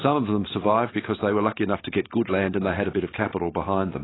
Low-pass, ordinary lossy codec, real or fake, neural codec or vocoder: 7.2 kHz; AAC, 16 kbps; real; none